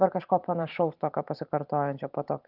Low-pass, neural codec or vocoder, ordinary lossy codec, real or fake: 5.4 kHz; vocoder, 44.1 kHz, 128 mel bands every 512 samples, BigVGAN v2; Opus, 24 kbps; fake